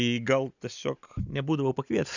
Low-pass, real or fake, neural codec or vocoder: 7.2 kHz; real; none